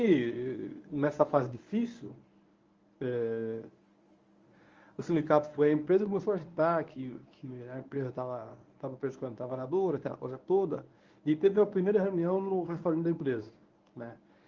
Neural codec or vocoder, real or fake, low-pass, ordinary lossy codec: codec, 24 kHz, 0.9 kbps, WavTokenizer, medium speech release version 1; fake; 7.2 kHz; Opus, 32 kbps